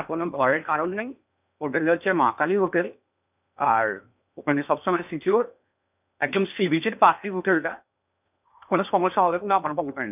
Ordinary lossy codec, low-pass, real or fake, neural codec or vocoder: none; 3.6 kHz; fake; codec, 16 kHz in and 24 kHz out, 0.8 kbps, FocalCodec, streaming, 65536 codes